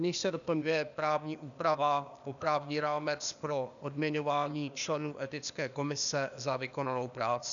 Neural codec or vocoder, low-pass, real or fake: codec, 16 kHz, 0.8 kbps, ZipCodec; 7.2 kHz; fake